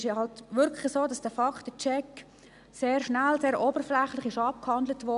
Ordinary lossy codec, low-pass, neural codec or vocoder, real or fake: none; 10.8 kHz; none; real